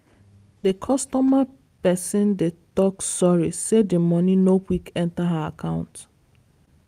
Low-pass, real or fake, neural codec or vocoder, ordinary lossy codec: 14.4 kHz; real; none; Opus, 32 kbps